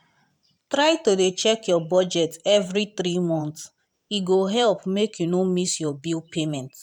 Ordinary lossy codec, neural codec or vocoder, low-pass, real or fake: none; vocoder, 44.1 kHz, 128 mel bands every 512 samples, BigVGAN v2; 19.8 kHz; fake